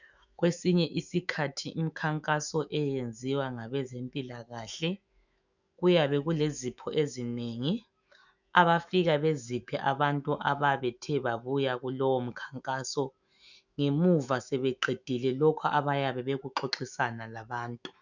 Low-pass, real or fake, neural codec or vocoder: 7.2 kHz; fake; autoencoder, 48 kHz, 128 numbers a frame, DAC-VAE, trained on Japanese speech